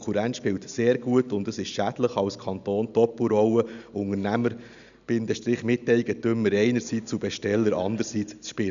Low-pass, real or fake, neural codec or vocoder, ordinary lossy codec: 7.2 kHz; real; none; none